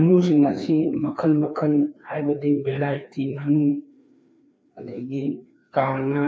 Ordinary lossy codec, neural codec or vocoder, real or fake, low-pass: none; codec, 16 kHz, 2 kbps, FreqCodec, larger model; fake; none